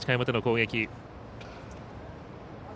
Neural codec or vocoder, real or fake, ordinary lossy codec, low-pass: none; real; none; none